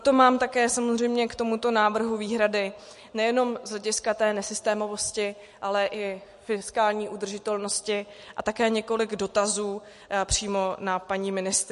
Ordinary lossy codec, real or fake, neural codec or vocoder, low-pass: MP3, 48 kbps; real; none; 14.4 kHz